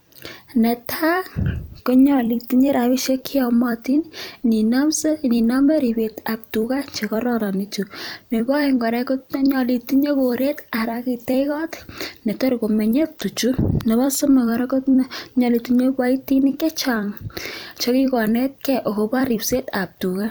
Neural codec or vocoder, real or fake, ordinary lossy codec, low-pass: vocoder, 44.1 kHz, 128 mel bands every 256 samples, BigVGAN v2; fake; none; none